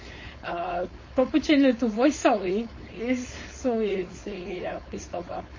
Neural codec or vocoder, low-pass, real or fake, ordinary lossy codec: codec, 16 kHz, 4.8 kbps, FACodec; 7.2 kHz; fake; MP3, 32 kbps